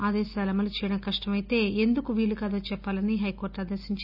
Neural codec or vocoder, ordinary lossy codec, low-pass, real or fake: none; none; 5.4 kHz; real